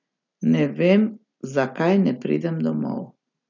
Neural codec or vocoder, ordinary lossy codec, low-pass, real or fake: none; none; 7.2 kHz; real